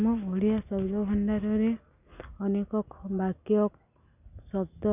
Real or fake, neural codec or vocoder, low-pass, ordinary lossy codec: real; none; 3.6 kHz; none